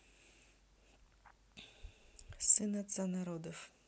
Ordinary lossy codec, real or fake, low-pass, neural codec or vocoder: none; real; none; none